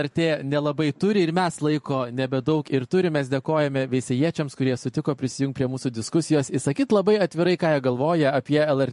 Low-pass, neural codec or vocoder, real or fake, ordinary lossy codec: 14.4 kHz; vocoder, 44.1 kHz, 128 mel bands every 512 samples, BigVGAN v2; fake; MP3, 48 kbps